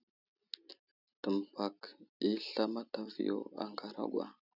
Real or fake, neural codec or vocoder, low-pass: real; none; 5.4 kHz